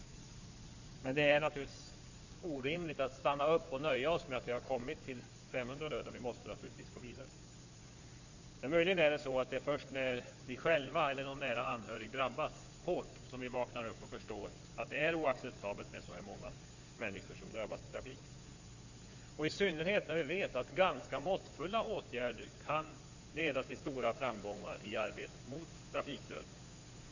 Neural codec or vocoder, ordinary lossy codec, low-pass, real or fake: codec, 16 kHz in and 24 kHz out, 2.2 kbps, FireRedTTS-2 codec; none; 7.2 kHz; fake